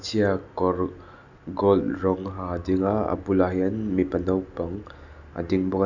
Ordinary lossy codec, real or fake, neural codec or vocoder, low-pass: none; real; none; 7.2 kHz